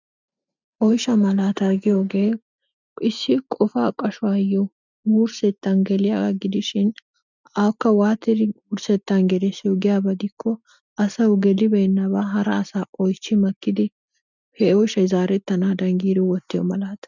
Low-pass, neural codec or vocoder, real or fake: 7.2 kHz; none; real